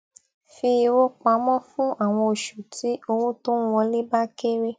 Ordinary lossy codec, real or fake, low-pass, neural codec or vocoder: none; real; none; none